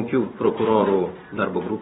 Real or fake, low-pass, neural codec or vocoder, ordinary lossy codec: fake; 19.8 kHz; vocoder, 44.1 kHz, 128 mel bands every 256 samples, BigVGAN v2; AAC, 16 kbps